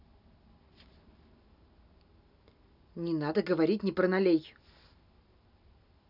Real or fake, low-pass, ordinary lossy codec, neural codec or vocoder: real; 5.4 kHz; none; none